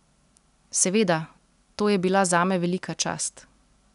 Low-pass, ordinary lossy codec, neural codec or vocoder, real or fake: 10.8 kHz; none; none; real